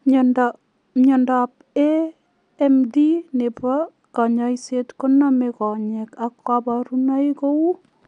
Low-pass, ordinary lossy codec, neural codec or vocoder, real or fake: 9.9 kHz; none; none; real